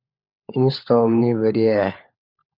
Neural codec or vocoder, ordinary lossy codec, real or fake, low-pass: codec, 16 kHz, 4 kbps, FunCodec, trained on LibriTTS, 50 frames a second; Opus, 64 kbps; fake; 5.4 kHz